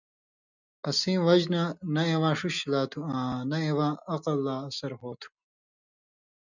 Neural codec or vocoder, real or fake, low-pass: none; real; 7.2 kHz